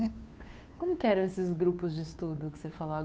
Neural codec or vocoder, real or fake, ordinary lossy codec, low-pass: codec, 16 kHz, 2 kbps, FunCodec, trained on Chinese and English, 25 frames a second; fake; none; none